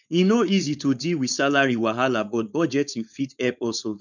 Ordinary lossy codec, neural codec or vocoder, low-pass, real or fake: none; codec, 16 kHz, 4.8 kbps, FACodec; 7.2 kHz; fake